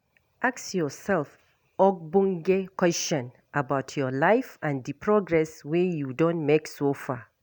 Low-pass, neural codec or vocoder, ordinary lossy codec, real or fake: none; none; none; real